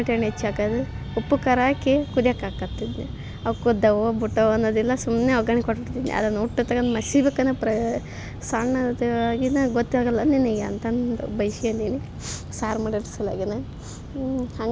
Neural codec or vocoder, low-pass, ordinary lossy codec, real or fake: none; none; none; real